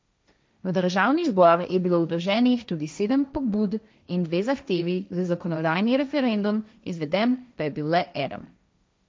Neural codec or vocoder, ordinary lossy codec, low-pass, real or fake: codec, 16 kHz, 1.1 kbps, Voila-Tokenizer; none; none; fake